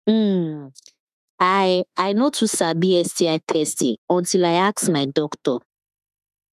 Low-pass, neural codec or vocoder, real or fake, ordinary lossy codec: 14.4 kHz; autoencoder, 48 kHz, 32 numbers a frame, DAC-VAE, trained on Japanese speech; fake; none